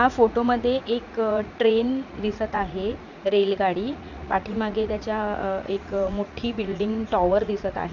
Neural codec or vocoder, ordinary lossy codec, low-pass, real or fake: vocoder, 44.1 kHz, 80 mel bands, Vocos; none; 7.2 kHz; fake